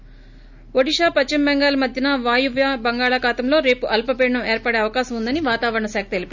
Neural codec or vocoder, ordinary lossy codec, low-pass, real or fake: none; none; 7.2 kHz; real